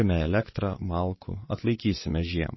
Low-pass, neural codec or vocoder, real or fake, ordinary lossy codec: 7.2 kHz; vocoder, 22.05 kHz, 80 mel bands, WaveNeXt; fake; MP3, 24 kbps